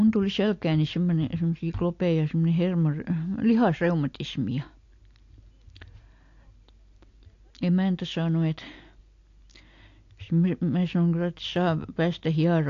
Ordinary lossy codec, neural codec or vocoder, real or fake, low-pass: AAC, 48 kbps; none; real; 7.2 kHz